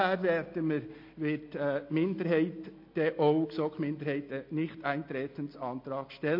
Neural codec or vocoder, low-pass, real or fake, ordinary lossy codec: none; 5.4 kHz; real; MP3, 32 kbps